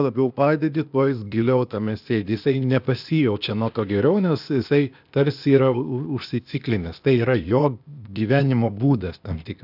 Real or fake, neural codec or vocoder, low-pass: fake; codec, 16 kHz, 0.8 kbps, ZipCodec; 5.4 kHz